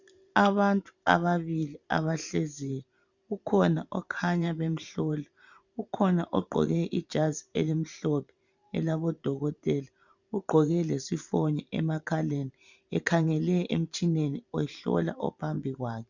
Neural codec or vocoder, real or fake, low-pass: vocoder, 44.1 kHz, 128 mel bands every 512 samples, BigVGAN v2; fake; 7.2 kHz